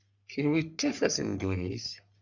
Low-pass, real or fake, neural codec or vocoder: 7.2 kHz; fake; codec, 44.1 kHz, 3.4 kbps, Pupu-Codec